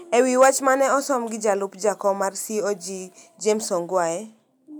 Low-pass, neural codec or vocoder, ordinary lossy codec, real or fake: none; none; none; real